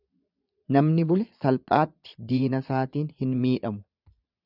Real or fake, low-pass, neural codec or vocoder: fake; 5.4 kHz; vocoder, 22.05 kHz, 80 mel bands, Vocos